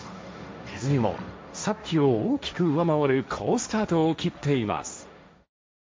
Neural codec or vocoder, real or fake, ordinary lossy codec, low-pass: codec, 16 kHz, 1.1 kbps, Voila-Tokenizer; fake; none; none